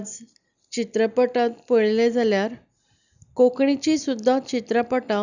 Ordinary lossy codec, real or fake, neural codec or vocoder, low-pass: none; real; none; 7.2 kHz